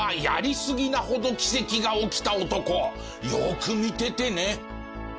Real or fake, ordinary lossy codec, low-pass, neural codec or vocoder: real; none; none; none